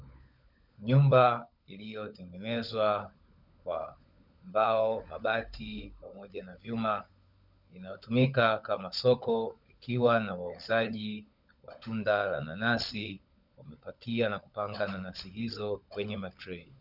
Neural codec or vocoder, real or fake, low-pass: codec, 16 kHz, 16 kbps, FunCodec, trained on LibriTTS, 50 frames a second; fake; 5.4 kHz